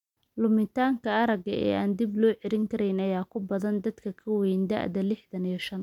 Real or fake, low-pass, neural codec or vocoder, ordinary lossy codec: real; 19.8 kHz; none; MP3, 96 kbps